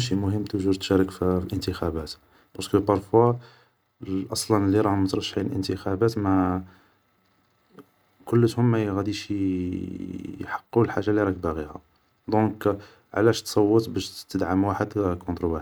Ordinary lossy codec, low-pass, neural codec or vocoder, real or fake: none; none; none; real